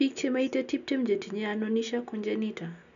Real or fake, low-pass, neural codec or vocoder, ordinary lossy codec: real; 7.2 kHz; none; none